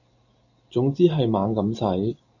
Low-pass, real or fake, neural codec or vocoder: 7.2 kHz; real; none